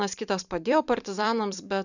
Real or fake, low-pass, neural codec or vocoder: fake; 7.2 kHz; vocoder, 44.1 kHz, 128 mel bands every 256 samples, BigVGAN v2